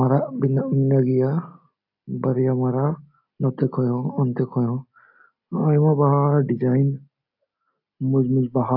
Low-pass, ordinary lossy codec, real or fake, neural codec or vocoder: 5.4 kHz; AAC, 48 kbps; real; none